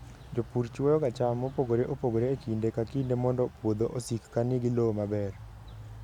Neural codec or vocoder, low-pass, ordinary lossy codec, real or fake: none; 19.8 kHz; none; real